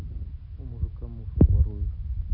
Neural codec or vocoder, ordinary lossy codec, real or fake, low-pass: none; none; real; 5.4 kHz